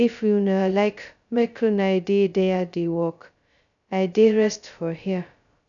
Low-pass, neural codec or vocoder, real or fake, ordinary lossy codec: 7.2 kHz; codec, 16 kHz, 0.2 kbps, FocalCodec; fake; none